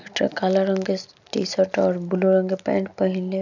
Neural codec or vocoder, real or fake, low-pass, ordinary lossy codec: none; real; 7.2 kHz; none